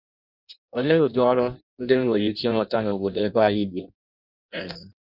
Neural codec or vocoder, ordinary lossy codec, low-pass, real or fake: codec, 16 kHz in and 24 kHz out, 0.6 kbps, FireRedTTS-2 codec; none; 5.4 kHz; fake